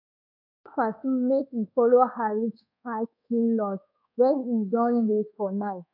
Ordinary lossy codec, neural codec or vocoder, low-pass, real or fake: none; codec, 24 kHz, 1.2 kbps, DualCodec; 5.4 kHz; fake